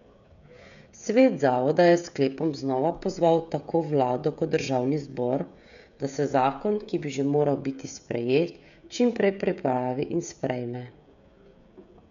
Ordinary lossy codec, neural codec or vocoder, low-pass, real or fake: none; codec, 16 kHz, 16 kbps, FreqCodec, smaller model; 7.2 kHz; fake